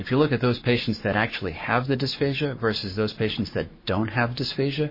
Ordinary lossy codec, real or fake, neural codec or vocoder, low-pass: MP3, 24 kbps; fake; codec, 44.1 kHz, 7.8 kbps, Pupu-Codec; 5.4 kHz